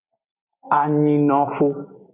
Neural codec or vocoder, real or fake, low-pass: none; real; 3.6 kHz